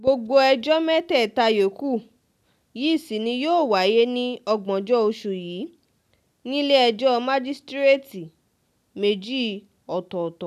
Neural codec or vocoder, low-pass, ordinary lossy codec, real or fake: none; 14.4 kHz; none; real